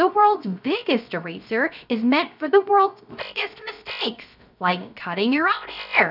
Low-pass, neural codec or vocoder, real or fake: 5.4 kHz; codec, 16 kHz, 0.3 kbps, FocalCodec; fake